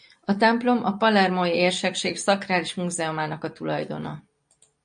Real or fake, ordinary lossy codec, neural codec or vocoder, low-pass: real; MP3, 48 kbps; none; 9.9 kHz